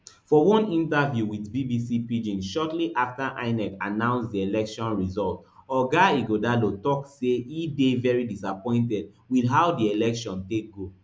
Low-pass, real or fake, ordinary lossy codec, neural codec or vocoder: none; real; none; none